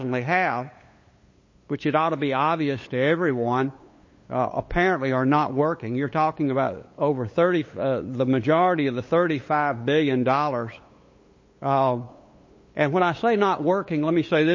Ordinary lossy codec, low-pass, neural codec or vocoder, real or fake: MP3, 32 kbps; 7.2 kHz; codec, 16 kHz, 8 kbps, FunCodec, trained on LibriTTS, 25 frames a second; fake